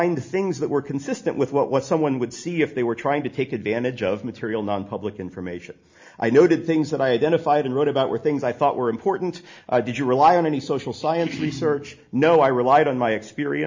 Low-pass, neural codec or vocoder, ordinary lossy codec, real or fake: 7.2 kHz; none; MP3, 64 kbps; real